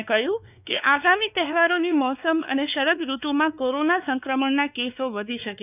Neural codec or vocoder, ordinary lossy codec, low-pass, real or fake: codec, 16 kHz, 2 kbps, X-Codec, WavLM features, trained on Multilingual LibriSpeech; none; 3.6 kHz; fake